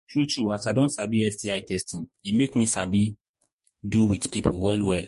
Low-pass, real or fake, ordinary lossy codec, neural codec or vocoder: 14.4 kHz; fake; MP3, 48 kbps; codec, 44.1 kHz, 2.6 kbps, DAC